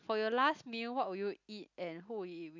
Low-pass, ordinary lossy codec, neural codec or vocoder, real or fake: 7.2 kHz; none; none; real